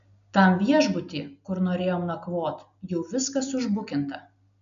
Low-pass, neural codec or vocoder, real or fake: 7.2 kHz; none; real